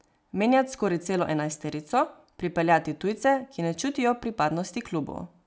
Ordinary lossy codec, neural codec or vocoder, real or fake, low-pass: none; none; real; none